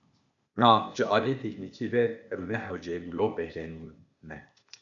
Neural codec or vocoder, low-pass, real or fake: codec, 16 kHz, 0.8 kbps, ZipCodec; 7.2 kHz; fake